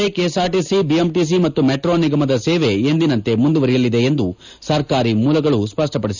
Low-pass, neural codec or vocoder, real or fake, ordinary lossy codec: 7.2 kHz; none; real; none